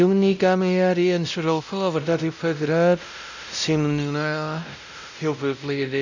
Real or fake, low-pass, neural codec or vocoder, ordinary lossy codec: fake; 7.2 kHz; codec, 16 kHz, 0.5 kbps, X-Codec, WavLM features, trained on Multilingual LibriSpeech; none